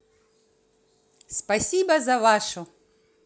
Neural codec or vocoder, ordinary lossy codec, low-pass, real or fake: none; none; none; real